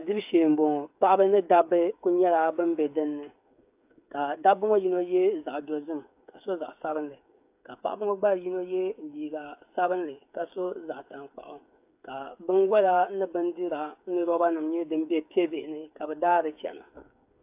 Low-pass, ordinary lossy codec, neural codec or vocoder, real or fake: 3.6 kHz; AAC, 32 kbps; codec, 16 kHz, 8 kbps, FreqCodec, smaller model; fake